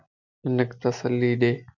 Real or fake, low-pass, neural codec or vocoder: real; 7.2 kHz; none